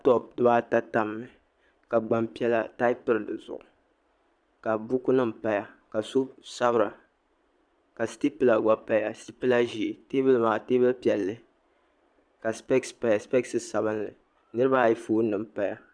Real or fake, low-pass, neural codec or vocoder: fake; 9.9 kHz; vocoder, 22.05 kHz, 80 mel bands, Vocos